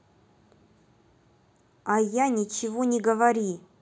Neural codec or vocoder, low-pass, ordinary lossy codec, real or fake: none; none; none; real